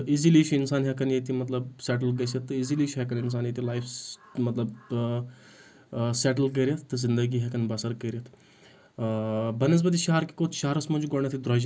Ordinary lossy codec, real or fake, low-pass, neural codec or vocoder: none; real; none; none